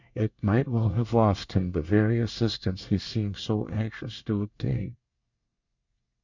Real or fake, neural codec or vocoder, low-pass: fake; codec, 24 kHz, 1 kbps, SNAC; 7.2 kHz